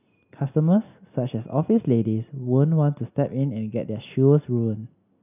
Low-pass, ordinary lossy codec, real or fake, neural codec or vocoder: 3.6 kHz; none; real; none